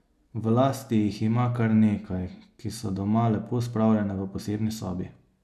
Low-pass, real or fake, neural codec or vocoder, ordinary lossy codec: 14.4 kHz; real; none; none